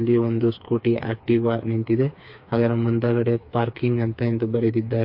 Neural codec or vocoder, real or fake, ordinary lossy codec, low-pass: codec, 16 kHz, 4 kbps, FreqCodec, smaller model; fake; MP3, 32 kbps; 5.4 kHz